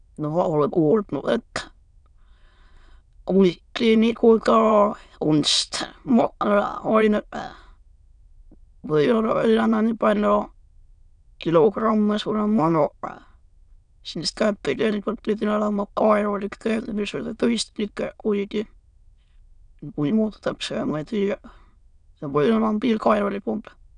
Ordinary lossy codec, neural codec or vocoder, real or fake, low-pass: none; autoencoder, 22.05 kHz, a latent of 192 numbers a frame, VITS, trained on many speakers; fake; 9.9 kHz